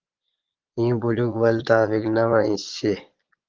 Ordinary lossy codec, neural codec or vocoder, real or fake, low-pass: Opus, 16 kbps; vocoder, 22.05 kHz, 80 mel bands, Vocos; fake; 7.2 kHz